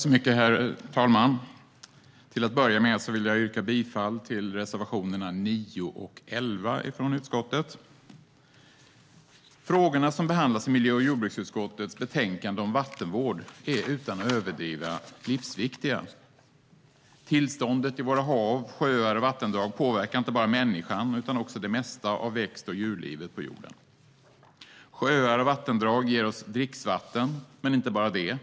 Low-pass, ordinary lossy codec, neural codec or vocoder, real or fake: none; none; none; real